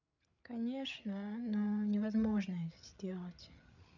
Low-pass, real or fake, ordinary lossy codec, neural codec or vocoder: 7.2 kHz; fake; none; codec, 16 kHz, 8 kbps, FreqCodec, larger model